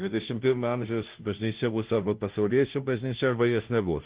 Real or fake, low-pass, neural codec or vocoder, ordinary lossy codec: fake; 3.6 kHz; codec, 16 kHz, 0.5 kbps, FunCodec, trained on Chinese and English, 25 frames a second; Opus, 16 kbps